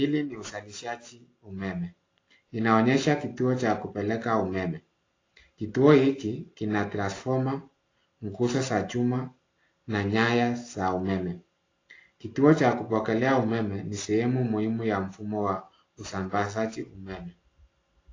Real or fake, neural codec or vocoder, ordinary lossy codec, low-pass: real; none; AAC, 32 kbps; 7.2 kHz